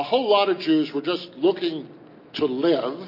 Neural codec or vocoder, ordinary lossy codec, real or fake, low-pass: none; MP3, 32 kbps; real; 5.4 kHz